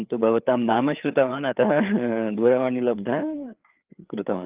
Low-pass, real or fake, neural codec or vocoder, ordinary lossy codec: 3.6 kHz; fake; codec, 16 kHz, 4 kbps, FreqCodec, larger model; Opus, 32 kbps